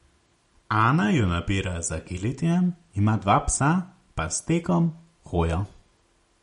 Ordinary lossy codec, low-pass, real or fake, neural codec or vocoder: MP3, 48 kbps; 19.8 kHz; real; none